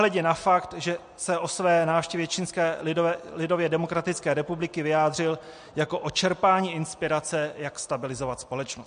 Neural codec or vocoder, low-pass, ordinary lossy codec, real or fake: none; 9.9 kHz; MP3, 48 kbps; real